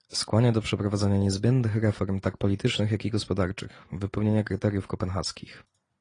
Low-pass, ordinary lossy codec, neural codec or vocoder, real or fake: 9.9 kHz; AAC, 32 kbps; none; real